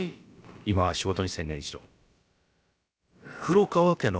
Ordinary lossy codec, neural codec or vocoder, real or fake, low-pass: none; codec, 16 kHz, about 1 kbps, DyCAST, with the encoder's durations; fake; none